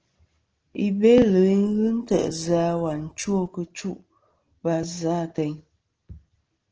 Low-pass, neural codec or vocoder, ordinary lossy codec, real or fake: 7.2 kHz; none; Opus, 16 kbps; real